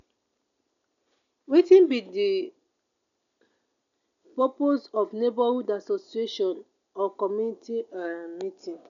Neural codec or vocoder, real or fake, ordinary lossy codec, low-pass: none; real; none; 7.2 kHz